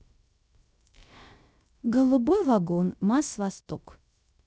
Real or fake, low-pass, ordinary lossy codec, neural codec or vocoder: fake; none; none; codec, 16 kHz, 0.3 kbps, FocalCodec